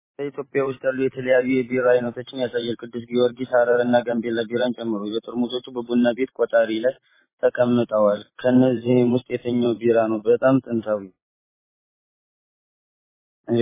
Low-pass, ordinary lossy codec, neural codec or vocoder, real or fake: 3.6 kHz; MP3, 16 kbps; vocoder, 44.1 kHz, 128 mel bands every 512 samples, BigVGAN v2; fake